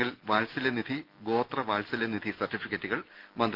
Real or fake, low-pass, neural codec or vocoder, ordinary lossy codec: real; 5.4 kHz; none; Opus, 32 kbps